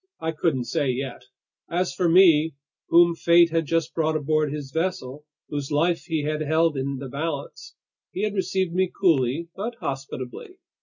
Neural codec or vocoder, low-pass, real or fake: none; 7.2 kHz; real